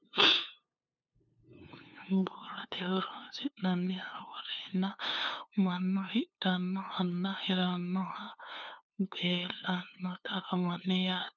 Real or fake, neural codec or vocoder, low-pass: fake; codec, 16 kHz, 2 kbps, FunCodec, trained on LibriTTS, 25 frames a second; 7.2 kHz